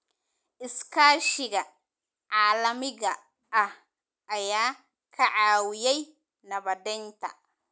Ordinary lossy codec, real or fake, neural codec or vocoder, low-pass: none; real; none; none